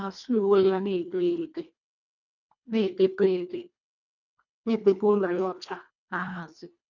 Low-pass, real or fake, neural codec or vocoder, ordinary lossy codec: 7.2 kHz; fake; codec, 24 kHz, 1.5 kbps, HILCodec; none